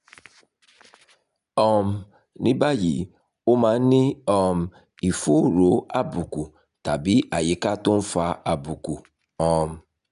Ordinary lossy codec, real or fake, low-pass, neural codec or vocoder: none; real; 10.8 kHz; none